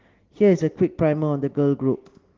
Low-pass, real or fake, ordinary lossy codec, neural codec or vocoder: 7.2 kHz; real; Opus, 16 kbps; none